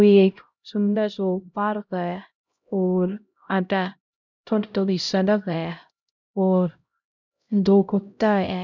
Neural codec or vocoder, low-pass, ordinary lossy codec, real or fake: codec, 16 kHz, 0.5 kbps, X-Codec, HuBERT features, trained on LibriSpeech; 7.2 kHz; none; fake